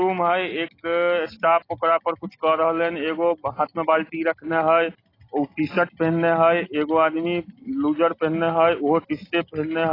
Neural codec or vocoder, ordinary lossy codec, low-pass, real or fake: none; AAC, 24 kbps; 5.4 kHz; real